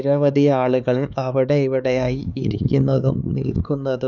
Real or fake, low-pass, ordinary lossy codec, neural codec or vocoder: fake; 7.2 kHz; none; codec, 16 kHz, 4 kbps, X-Codec, HuBERT features, trained on LibriSpeech